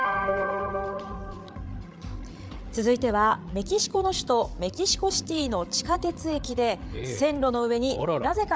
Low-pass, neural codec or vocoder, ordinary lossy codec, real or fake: none; codec, 16 kHz, 8 kbps, FreqCodec, larger model; none; fake